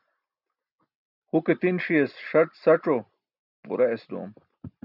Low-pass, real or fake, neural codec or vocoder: 5.4 kHz; real; none